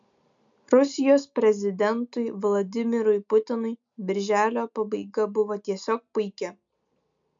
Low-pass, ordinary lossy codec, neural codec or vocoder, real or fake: 7.2 kHz; MP3, 64 kbps; none; real